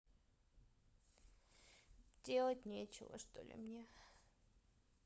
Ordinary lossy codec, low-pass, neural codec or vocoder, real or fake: none; none; codec, 16 kHz, 16 kbps, FunCodec, trained on LibriTTS, 50 frames a second; fake